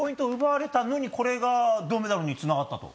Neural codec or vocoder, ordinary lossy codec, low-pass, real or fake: none; none; none; real